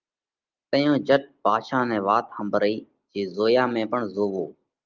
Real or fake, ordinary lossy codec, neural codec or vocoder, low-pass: real; Opus, 32 kbps; none; 7.2 kHz